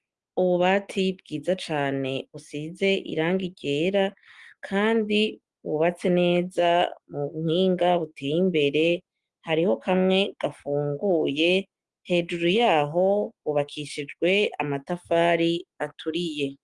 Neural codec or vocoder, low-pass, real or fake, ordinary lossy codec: none; 10.8 kHz; real; Opus, 24 kbps